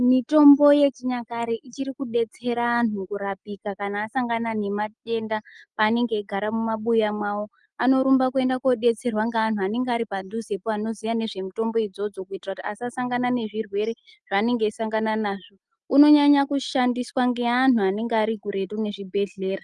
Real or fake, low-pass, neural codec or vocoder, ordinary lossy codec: real; 10.8 kHz; none; Opus, 32 kbps